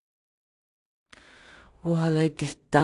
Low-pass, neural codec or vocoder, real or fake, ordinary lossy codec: 10.8 kHz; codec, 16 kHz in and 24 kHz out, 0.4 kbps, LongCat-Audio-Codec, two codebook decoder; fake; AAC, 48 kbps